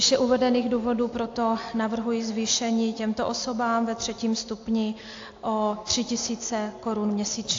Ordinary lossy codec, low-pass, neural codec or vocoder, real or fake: AAC, 48 kbps; 7.2 kHz; none; real